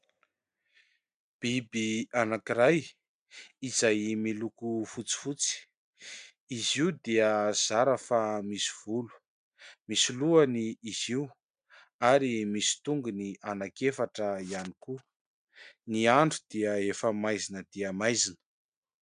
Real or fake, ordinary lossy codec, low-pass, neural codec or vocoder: real; MP3, 96 kbps; 9.9 kHz; none